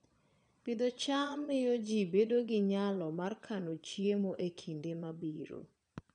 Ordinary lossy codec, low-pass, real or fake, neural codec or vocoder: none; 9.9 kHz; fake; vocoder, 22.05 kHz, 80 mel bands, Vocos